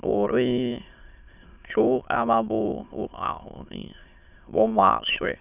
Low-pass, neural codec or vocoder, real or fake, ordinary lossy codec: 3.6 kHz; autoencoder, 22.05 kHz, a latent of 192 numbers a frame, VITS, trained on many speakers; fake; none